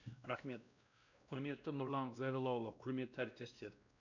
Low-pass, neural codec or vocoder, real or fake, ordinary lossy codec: 7.2 kHz; codec, 16 kHz, 1 kbps, X-Codec, WavLM features, trained on Multilingual LibriSpeech; fake; none